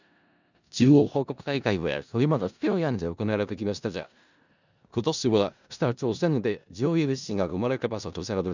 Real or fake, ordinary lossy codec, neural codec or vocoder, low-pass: fake; none; codec, 16 kHz in and 24 kHz out, 0.4 kbps, LongCat-Audio-Codec, four codebook decoder; 7.2 kHz